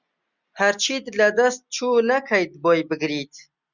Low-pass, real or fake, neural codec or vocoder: 7.2 kHz; real; none